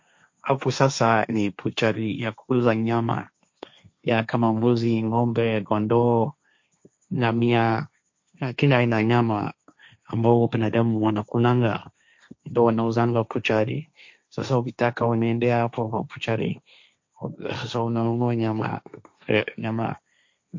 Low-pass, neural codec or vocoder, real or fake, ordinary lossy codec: 7.2 kHz; codec, 16 kHz, 1.1 kbps, Voila-Tokenizer; fake; MP3, 48 kbps